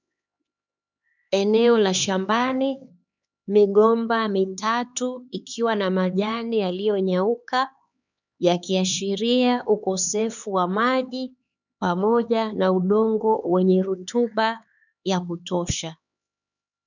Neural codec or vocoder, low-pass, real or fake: codec, 16 kHz, 4 kbps, X-Codec, HuBERT features, trained on LibriSpeech; 7.2 kHz; fake